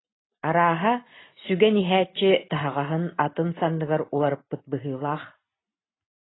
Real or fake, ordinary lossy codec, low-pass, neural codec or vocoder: real; AAC, 16 kbps; 7.2 kHz; none